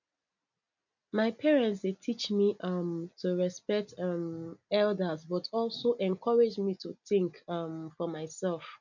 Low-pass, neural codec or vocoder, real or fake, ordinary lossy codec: 7.2 kHz; none; real; none